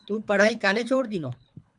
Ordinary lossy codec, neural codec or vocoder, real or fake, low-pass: MP3, 96 kbps; codec, 24 kHz, 3 kbps, HILCodec; fake; 10.8 kHz